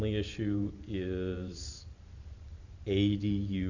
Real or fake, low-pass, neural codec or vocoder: fake; 7.2 kHz; vocoder, 44.1 kHz, 128 mel bands every 512 samples, BigVGAN v2